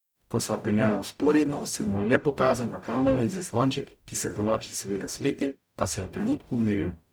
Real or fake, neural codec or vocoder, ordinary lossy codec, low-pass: fake; codec, 44.1 kHz, 0.9 kbps, DAC; none; none